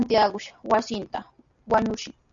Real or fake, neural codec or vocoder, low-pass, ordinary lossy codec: real; none; 7.2 kHz; Opus, 64 kbps